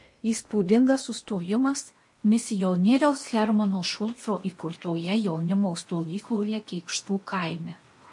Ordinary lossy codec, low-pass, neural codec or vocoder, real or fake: MP3, 48 kbps; 10.8 kHz; codec, 16 kHz in and 24 kHz out, 0.8 kbps, FocalCodec, streaming, 65536 codes; fake